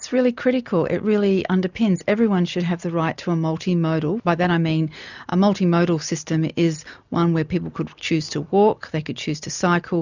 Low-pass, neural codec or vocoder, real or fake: 7.2 kHz; none; real